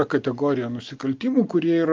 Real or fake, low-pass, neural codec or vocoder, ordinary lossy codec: real; 7.2 kHz; none; Opus, 24 kbps